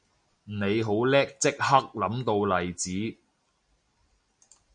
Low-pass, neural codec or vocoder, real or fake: 9.9 kHz; none; real